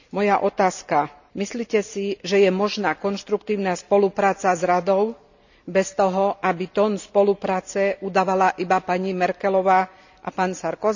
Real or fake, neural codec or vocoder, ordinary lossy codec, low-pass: real; none; none; 7.2 kHz